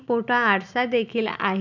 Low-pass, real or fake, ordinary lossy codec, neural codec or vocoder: 7.2 kHz; real; none; none